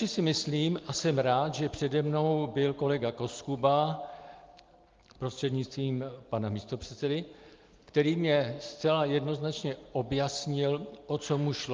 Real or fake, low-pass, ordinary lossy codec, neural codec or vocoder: real; 7.2 kHz; Opus, 32 kbps; none